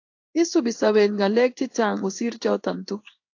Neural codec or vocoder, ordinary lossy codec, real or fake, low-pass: codec, 16 kHz in and 24 kHz out, 1 kbps, XY-Tokenizer; AAC, 48 kbps; fake; 7.2 kHz